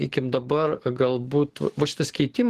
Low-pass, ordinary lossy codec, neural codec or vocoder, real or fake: 14.4 kHz; Opus, 16 kbps; codec, 44.1 kHz, 7.8 kbps, DAC; fake